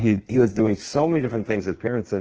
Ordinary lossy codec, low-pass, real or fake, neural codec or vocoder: Opus, 16 kbps; 7.2 kHz; fake; codec, 16 kHz in and 24 kHz out, 1.1 kbps, FireRedTTS-2 codec